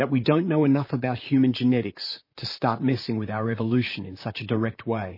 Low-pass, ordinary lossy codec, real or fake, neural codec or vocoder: 5.4 kHz; MP3, 24 kbps; fake; vocoder, 44.1 kHz, 128 mel bands, Pupu-Vocoder